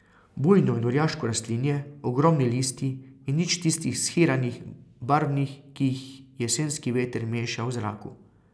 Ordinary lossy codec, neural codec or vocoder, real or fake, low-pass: none; none; real; none